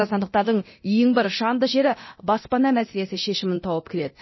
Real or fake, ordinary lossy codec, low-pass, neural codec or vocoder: fake; MP3, 24 kbps; 7.2 kHz; codec, 24 kHz, 0.9 kbps, DualCodec